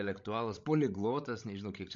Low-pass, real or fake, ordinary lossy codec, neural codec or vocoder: 7.2 kHz; fake; MP3, 48 kbps; codec, 16 kHz, 16 kbps, FreqCodec, larger model